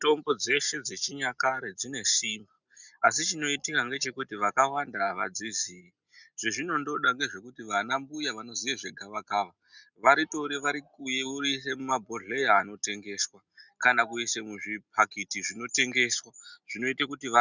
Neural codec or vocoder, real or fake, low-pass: none; real; 7.2 kHz